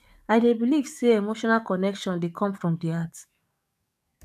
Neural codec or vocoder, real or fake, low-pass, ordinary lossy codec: codec, 44.1 kHz, 7.8 kbps, DAC; fake; 14.4 kHz; none